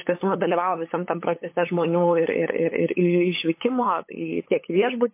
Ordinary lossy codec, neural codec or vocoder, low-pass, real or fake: MP3, 24 kbps; codec, 16 kHz, 8 kbps, FunCodec, trained on LibriTTS, 25 frames a second; 3.6 kHz; fake